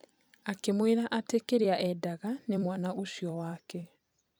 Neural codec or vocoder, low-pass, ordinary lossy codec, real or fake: vocoder, 44.1 kHz, 128 mel bands every 256 samples, BigVGAN v2; none; none; fake